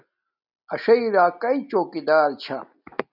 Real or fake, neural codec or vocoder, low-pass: real; none; 5.4 kHz